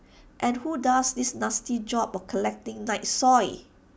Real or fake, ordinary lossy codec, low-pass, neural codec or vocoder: real; none; none; none